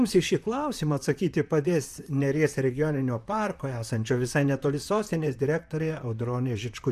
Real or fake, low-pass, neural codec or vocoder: fake; 14.4 kHz; vocoder, 44.1 kHz, 128 mel bands, Pupu-Vocoder